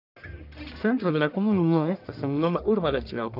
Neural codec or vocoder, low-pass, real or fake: codec, 44.1 kHz, 1.7 kbps, Pupu-Codec; 5.4 kHz; fake